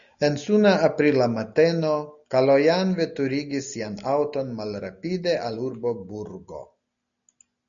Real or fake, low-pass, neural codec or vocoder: real; 7.2 kHz; none